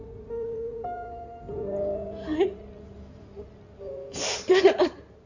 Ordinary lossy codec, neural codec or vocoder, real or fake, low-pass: none; codec, 16 kHz in and 24 kHz out, 2.2 kbps, FireRedTTS-2 codec; fake; 7.2 kHz